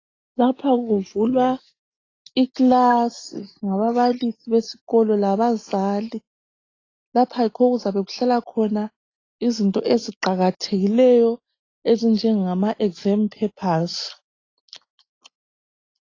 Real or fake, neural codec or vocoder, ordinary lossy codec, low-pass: real; none; AAC, 32 kbps; 7.2 kHz